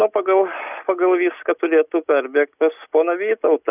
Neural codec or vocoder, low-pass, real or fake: none; 3.6 kHz; real